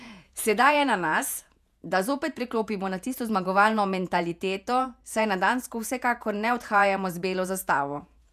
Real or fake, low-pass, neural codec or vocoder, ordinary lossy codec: fake; 14.4 kHz; vocoder, 48 kHz, 128 mel bands, Vocos; none